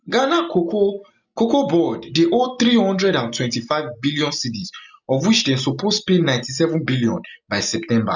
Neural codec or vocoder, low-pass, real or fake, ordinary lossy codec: none; 7.2 kHz; real; none